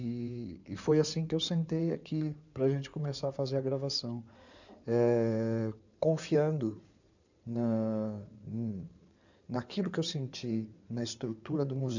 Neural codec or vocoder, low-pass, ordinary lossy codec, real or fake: codec, 16 kHz in and 24 kHz out, 2.2 kbps, FireRedTTS-2 codec; 7.2 kHz; none; fake